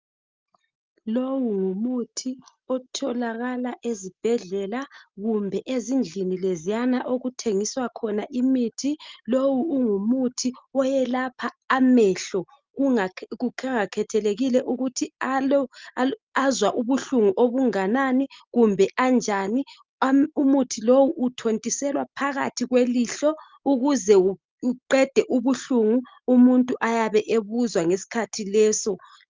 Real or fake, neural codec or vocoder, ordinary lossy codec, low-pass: real; none; Opus, 24 kbps; 7.2 kHz